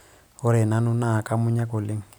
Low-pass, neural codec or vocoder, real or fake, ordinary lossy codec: none; none; real; none